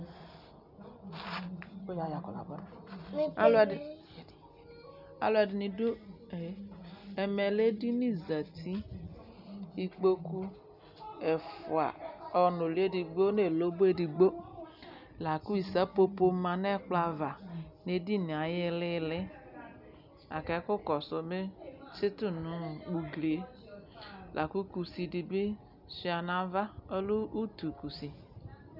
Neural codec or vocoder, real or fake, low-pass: none; real; 5.4 kHz